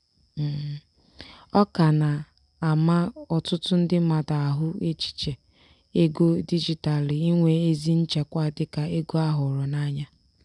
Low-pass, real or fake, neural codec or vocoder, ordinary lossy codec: 10.8 kHz; real; none; none